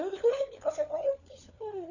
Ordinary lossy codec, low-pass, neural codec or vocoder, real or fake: none; 7.2 kHz; codec, 16 kHz, 4 kbps, FunCodec, trained on LibriTTS, 50 frames a second; fake